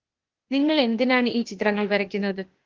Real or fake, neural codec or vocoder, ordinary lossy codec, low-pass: fake; codec, 16 kHz, 0.8 kbps, ZipCodec; Opus, 16 kbps; 7.2 kHz